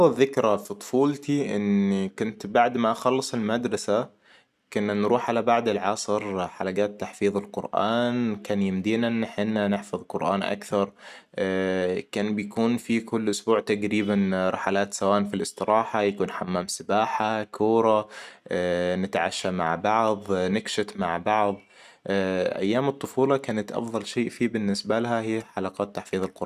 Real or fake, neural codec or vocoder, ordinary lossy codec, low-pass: real; none; none; 14.4 kHz